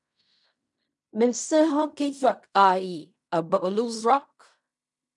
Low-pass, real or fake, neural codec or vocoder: 10.8 kHz; fake; codec, 16 kHz in and 24 kHz out, 0.4 kbps, LongCat-Audio-Codec, fine tuned four codebook decoder